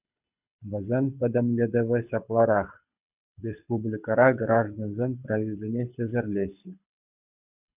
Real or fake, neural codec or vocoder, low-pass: fake; codec, 24 kHz, 6 kbps, HILCodec; 3.6 kHz